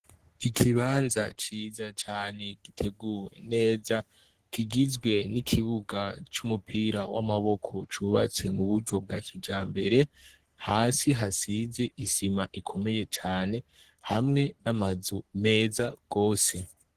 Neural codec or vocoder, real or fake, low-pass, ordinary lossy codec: codec, 44.1 kHz, 3.4 kbps, Pupu-Codec; fake; 14.4 kHz; Opus, 16 kbps